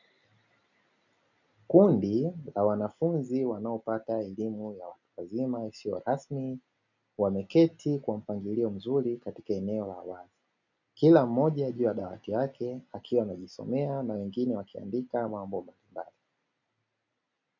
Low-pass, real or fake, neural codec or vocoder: 7.2 kHz; real; none